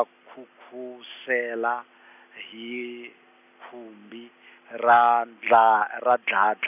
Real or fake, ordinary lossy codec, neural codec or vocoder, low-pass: real; none; none; 3.6 kHz